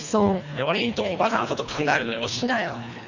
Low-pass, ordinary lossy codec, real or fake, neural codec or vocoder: 7.2 kHz; none; fake; codec, 24 kHz, 1.5 kbps, HILCodec